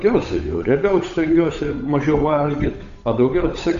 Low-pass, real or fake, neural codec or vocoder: 7.2 kHz; fake; codec, 16 kHz, 8 kbps, FunCodec, trained on Chinese and English, 25 frames a second